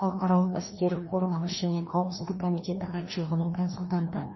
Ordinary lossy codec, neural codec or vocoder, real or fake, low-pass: MP3, 24 kbps; codec, 16 kHz, 1 kbps, FreqCodec, larger model; fake; 7.2 kHz